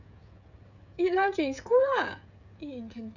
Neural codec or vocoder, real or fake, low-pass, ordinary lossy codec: codec, 16 kHz, 16 kbps, FreqCodec, smaller model; fake; 7.2 kHz; none